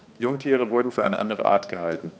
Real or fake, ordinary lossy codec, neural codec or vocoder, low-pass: fake; none; codec, 16 kHz, 2 kbps, X-Codec, HuBERT features, trained on balanced general audio; none